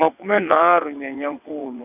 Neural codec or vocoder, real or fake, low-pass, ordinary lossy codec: vocoder, 22.05 kHz, 80 mel bands, WaveNeXt; fake; 3.6 kHz; none